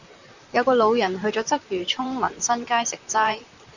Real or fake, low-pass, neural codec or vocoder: fake; 7.2 kHz; vocoder, 44.1 kHz, 128 mel bands, Pupu-Vocoder